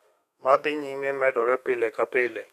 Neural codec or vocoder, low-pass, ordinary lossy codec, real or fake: codec, 32 kHz, 1.9 kbps, SNAC; 14.4 kHz; none; fake